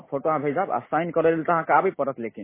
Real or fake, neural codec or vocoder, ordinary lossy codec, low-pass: real; none; MP3, 16 kbps; 3.6 kHz